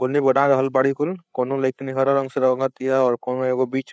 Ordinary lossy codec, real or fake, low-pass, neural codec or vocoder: none; fake; none; codec, 16 kHz, 8 kbps, FreqCodec, larger model